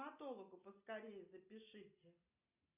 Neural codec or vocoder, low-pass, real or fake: none; 3.6 kHz; real